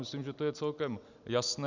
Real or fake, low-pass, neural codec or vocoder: real; 7.2 kHz; none